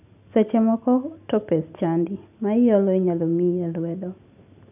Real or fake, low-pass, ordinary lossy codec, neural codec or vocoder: real; 3.6 kHz; none; none